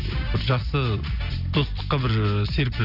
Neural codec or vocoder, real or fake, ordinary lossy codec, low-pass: none; real; AAC, 48 kbps; 5.4 kHz